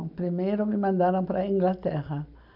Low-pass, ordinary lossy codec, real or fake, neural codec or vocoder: 5.4 kHz; none; real; none